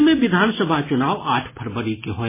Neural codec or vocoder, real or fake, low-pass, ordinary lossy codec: none; real; 3.6 kHz; MP3, 16 kbps